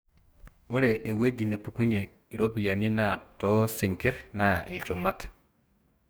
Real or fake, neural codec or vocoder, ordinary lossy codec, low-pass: fake; codec, 44.1 kHz, 2.6 kbps, DAC; none; none